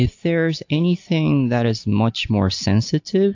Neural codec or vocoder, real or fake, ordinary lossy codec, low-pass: none; real; AAC, 48 kbps; 7.2 kHz